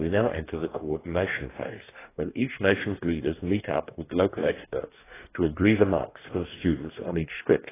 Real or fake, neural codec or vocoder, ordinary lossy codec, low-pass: fake; codec, 44.1 kHz, 2.6 kbps, DAC; AAC, 16 kbps; 3.6 kHz